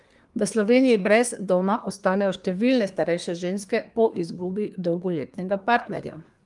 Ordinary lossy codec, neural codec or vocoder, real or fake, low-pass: Opus, 32 kbps; codec, 24 kHz, 1 kbps, SNAC; fake; 10.8 kHz